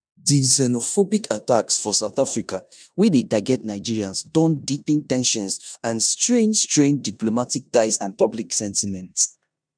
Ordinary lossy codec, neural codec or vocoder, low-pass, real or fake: none; codec, 16 kHz in and 24 kHz out, 0.9 kbps, LongCat-Audio-Codec, four codebook decoder; 9.9 kHz; fake